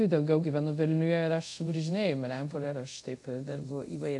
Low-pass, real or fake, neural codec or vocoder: 10.8 kHz; fake; codec, 24 kHz, 0.5 kbps, DualCodec